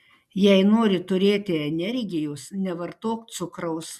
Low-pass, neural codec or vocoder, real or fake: 14.4 kHz; none; real